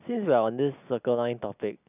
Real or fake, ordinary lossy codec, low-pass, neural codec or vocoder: real; none; 3.6 kHz; none